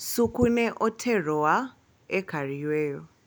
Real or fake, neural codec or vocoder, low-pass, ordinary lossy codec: real; none; none; none